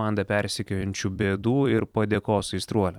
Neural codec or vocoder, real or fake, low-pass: vocoder, 44.1 kHz, 128 mel bands every 256 samples, BigVGAN v2; fake; 19.8 kHz